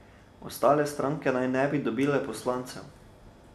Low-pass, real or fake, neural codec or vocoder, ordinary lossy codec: 14.4 kHz; real; none; none